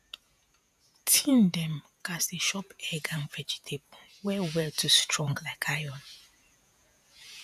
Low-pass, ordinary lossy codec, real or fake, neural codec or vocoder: 14.4 kHz; none; fake; vocoder, 48 kHz, 128 mel bands, Vocos